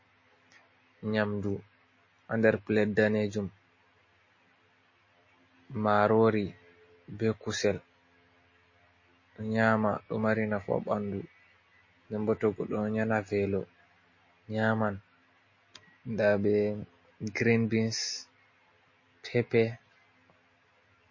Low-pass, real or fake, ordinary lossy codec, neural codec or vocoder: 7.2 kHz; real; MP3, 32 kbps; none